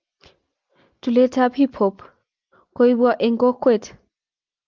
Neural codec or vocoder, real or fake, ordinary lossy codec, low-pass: none; real; Opus, 24 kbps; 7.2 kHz